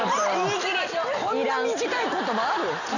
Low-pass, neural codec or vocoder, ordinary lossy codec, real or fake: 7.2 kHz; none; none; real